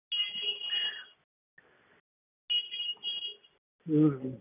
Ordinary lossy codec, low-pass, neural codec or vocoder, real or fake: none; 3.6 kHz; none; real